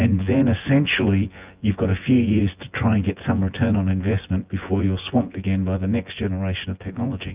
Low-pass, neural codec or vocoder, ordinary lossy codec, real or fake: 3.6 kHz; vocoder, 24 kHz, 100 mel bands, Vocos; Opus, 24 kbps; fake